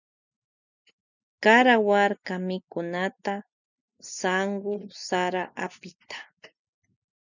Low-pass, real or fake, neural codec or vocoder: 7.2 kHz; real; none